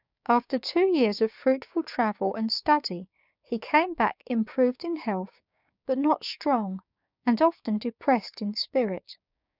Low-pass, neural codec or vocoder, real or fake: 5.4 kHz; codec, 16 kHz, 6 kbps, DAC; fake